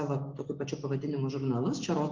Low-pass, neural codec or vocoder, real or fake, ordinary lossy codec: 7.2 kHz; none; real; Opus, 32 kbps